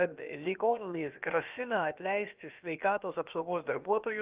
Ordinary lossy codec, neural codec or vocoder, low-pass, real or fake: Opus, 32 kbps; codec, 16 kHz, about 1 kbps, DyCAST, with the encoder's durations; 3.6 kHz; fake